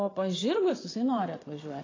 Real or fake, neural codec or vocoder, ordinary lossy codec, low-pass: fake; vocoder, 22.05 kHz, 80 mel bands, Vocos; AAC, 32 kbps; 7.2 kHz